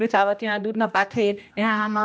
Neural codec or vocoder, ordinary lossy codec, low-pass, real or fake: codec, 16 kHz, 1 kbps, X-Codec, HuBERT features, trained on general audio; none; none; fake